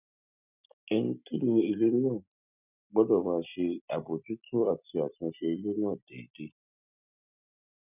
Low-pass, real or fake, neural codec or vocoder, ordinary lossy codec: 3.6 kHz; real; none; none